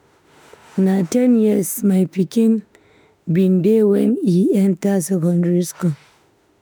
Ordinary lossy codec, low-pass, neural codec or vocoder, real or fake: none; none; autoencoder, 48 kHz, 32 numbers a frame, DAC-VAE, trained on Japanese speech; fake